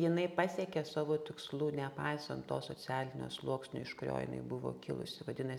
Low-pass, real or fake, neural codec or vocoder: 19.8 kHz; real; none